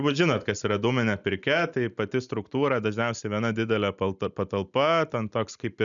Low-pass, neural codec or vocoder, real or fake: 7.2 kHz; none; real